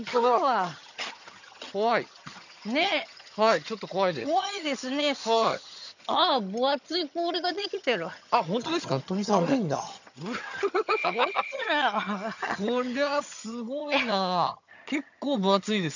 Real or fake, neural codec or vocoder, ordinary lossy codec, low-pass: fake; vocoder, 22.05 kHz, 80 mel bands, HiFi-GAN; none; 7.2 kHz